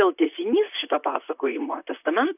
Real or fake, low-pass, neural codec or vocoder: fake; 3.6 kHz; vocoder, 44.1 kHz, 128 mel bands, Pupu-Vocoder